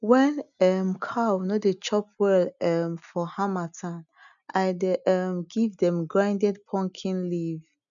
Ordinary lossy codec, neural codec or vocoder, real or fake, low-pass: none; none; real; 7.2 kHz